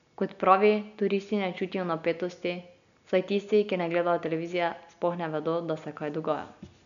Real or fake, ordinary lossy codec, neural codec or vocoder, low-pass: real; none; none; 7.2 kHz